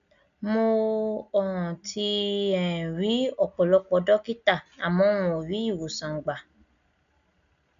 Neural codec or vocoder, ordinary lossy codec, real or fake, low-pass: none; none; real; 7.2 kHz